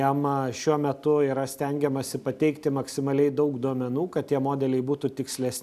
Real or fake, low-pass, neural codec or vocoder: real; 14.4 kHz; none